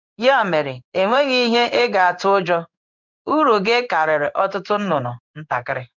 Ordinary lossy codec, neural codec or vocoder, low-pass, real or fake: none; codec, 16 kHz in and 24 kHz out, 1 kbps, XY-Tokenizer; 7.2 kHz; fake